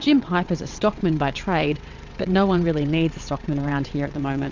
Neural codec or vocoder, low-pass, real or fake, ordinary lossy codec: vocoder, 22.05 kHz, 80 mel bands, WaveNeXt; 7.2 kHz; fake; MP3, 64 kbps